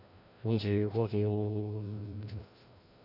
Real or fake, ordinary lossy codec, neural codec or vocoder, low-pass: fake; none; codec, 16 kHz, 1 kbps, FreqCodec, larger model; 5.4 kHz